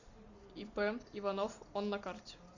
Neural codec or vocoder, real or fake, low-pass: none; real; 7.2 kHz